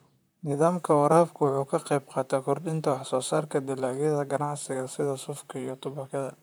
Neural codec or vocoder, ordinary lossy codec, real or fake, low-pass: vocoder, 44.1 kHz, 128 mel bands, Pupu-Vocoder; none; fake; none